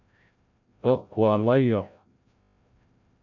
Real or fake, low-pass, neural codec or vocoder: fake; 7.2 kHz; codec, 16 kHz, 0.5 kbps, FreqCodec, larger model